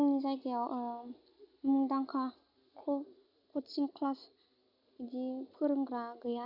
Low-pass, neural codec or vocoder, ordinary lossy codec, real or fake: 5.4 kHz; none; none; real